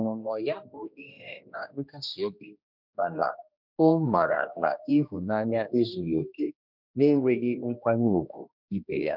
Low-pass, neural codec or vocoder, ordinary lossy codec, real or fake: 5.4 kHz; codec, 16 kHz, 1 kbps, X-Codec, HuBERT features, trained on general audio; none; fake